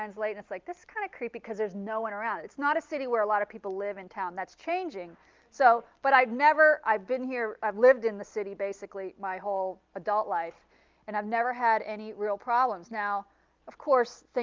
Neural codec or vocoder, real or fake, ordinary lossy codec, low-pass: none; real; Opus, 32 kbps; 7.2 kHz